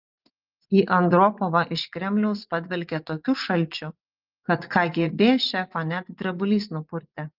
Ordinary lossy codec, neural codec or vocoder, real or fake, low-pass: Opus, 32 kbps; vocoder, 24 kHz, 100 mel bands, Vocos; fake; 5.4 kHz